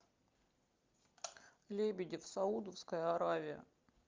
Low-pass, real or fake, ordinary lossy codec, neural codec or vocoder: 7.2 kHz; real; Opus, 24 kbps; none